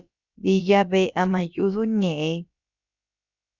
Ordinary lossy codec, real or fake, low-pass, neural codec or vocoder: Opus, 64 kbps; fake; 7.2 kHz; codec, 16 kHz, about 1 kbps, DyCAST, with the encoder's durations